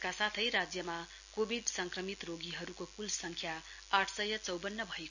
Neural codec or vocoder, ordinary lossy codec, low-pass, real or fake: none; none; 7.2 kHz; real